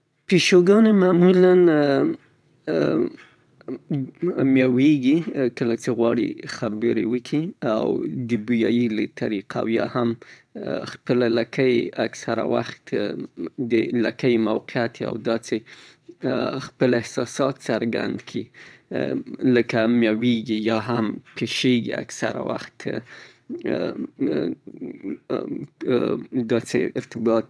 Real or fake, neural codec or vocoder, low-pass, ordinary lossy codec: fake; vocoder, 22.05 kHz, 80 mel bands, WaveNeXt; none; none